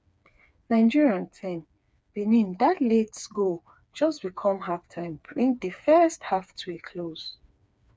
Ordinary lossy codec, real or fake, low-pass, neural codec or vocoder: none; fake; none; codec, 16 kHz, 4 kbps, FreqCodec, smaller model